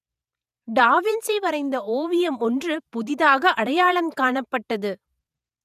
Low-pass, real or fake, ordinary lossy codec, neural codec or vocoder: 14.4 kHz; fake; none; vocoder, 48 kHz, 128 mel bands, Vocos